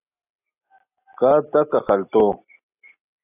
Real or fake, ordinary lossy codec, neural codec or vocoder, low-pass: real; AAC, 16 kbps; none; 3.6 kHz